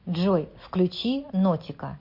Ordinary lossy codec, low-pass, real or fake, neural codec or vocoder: MP3, 32 kbps; 5.4 kHz; real; none